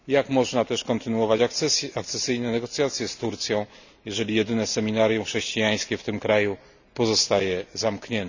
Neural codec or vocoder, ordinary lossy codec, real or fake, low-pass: none; none; real; 7.2 kHz